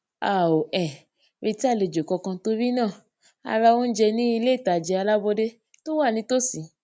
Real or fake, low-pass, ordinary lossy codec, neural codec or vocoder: real; none; none; none